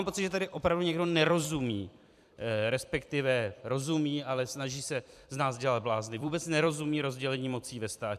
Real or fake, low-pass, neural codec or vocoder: fake; 14.4 kHz; vocoder, 44.1 kHz, 128 mel bands every 256 samples, BigVGAN v2